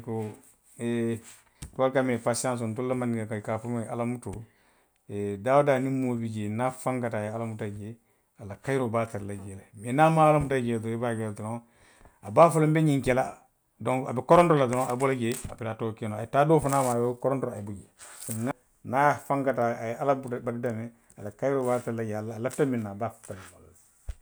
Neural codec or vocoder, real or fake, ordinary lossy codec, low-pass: none; real; none; none